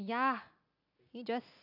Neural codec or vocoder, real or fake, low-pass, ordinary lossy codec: none; real; 5.4 kHz; AAC, 48 kbps